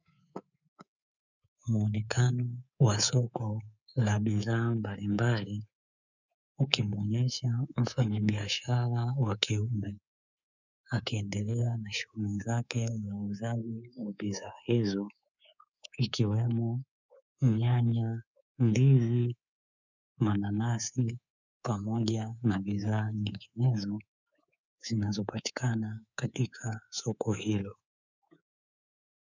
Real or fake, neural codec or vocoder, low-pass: fake; codec, 24 kHz, 3.1 kbps, DualCodec; 7.2 kHz